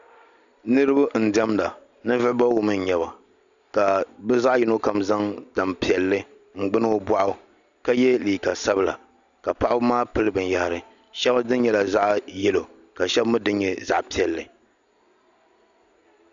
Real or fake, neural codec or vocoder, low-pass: real; none; 7.2 kHz